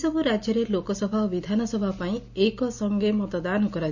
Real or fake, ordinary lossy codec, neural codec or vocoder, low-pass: real; none; none; 7.2 kHz